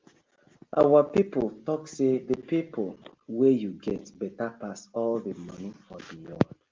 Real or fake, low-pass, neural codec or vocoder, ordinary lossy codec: real; 7.2 kHz; none; Opus, 32 kbps